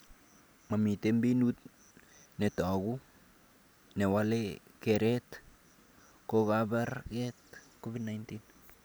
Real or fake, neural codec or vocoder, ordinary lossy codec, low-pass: real; none; none; none